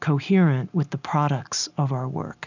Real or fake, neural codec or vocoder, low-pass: real; none; 7.2 kHz